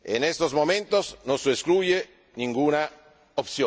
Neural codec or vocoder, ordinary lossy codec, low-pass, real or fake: none; none; none; real